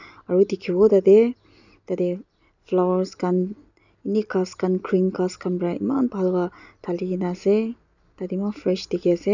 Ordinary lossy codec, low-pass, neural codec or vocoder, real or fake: none; 7.2 kHz; none; real